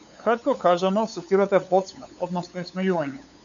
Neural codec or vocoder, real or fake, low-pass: codec, 16 kHz, 8 kbps, FunCodec, trained on LibriTTS, 25 frames a second; fake; 7.2 kHz